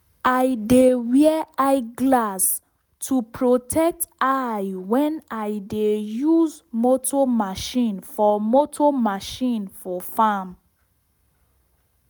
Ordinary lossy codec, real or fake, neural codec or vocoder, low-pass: none; real; none; none